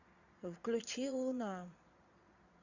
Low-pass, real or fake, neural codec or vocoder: 7.2 kHz; fake; vocoder, 22.05 kHz, 80 mel bands, Vocos